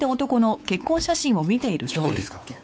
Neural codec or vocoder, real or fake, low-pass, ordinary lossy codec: codec, 16 kHz, 4 kbps, X-Codec, WavLM features, trained on Multilingual LibriSpeech; fake; none; none